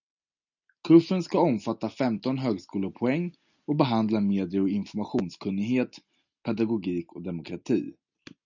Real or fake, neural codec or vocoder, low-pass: real; none; 7.2 kHz